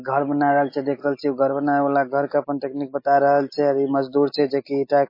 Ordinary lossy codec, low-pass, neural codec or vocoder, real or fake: MP3, 24 kbps; 5.4 kHz; none; real